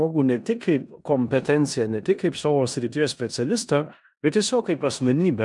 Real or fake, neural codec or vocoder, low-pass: fake; codec, 16 kHz in and 24 kHz out, 0.9 kbps, LongCat-Audio-Codec, four codebook decoder; 10.8 kHz